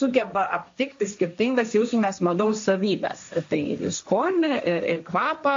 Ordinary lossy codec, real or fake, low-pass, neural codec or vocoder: MP3, 48 kbps; fake; 7.2 kHz; codec, 16 kHz, 1.1 kbps, Voila-Tokenizer